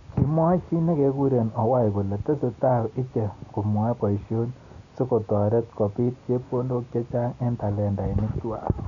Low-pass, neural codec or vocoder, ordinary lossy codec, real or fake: 7.2 kHz; none; none; real